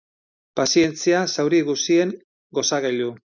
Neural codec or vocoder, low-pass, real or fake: none; 7.2 kHz; real